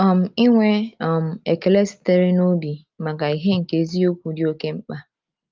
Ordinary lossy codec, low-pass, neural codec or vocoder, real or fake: Opus, 24 kbps; 7.2 kHz; none; real